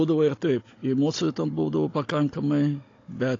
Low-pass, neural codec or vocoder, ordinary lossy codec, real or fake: 7.2 kHz; codec, 16 kHz, 16 kbps, FunCodec, trained on Chinese and English, 50 frames a second; AAC, 32 kbps; fake